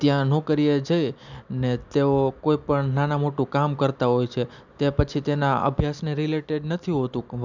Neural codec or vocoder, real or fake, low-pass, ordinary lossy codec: none; real; 7.2 kHz; none